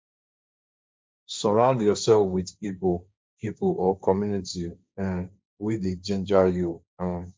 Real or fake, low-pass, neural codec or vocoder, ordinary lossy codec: fake; none; codec, 16 kHz, 1.1 kbps, Voila-Tokenizer; none